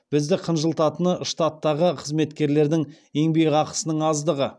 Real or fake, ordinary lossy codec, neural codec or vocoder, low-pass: real; none; none; none